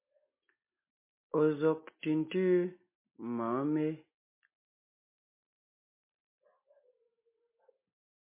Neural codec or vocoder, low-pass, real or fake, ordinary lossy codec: none; 3.6 kHz; real; MP3, 24 kbps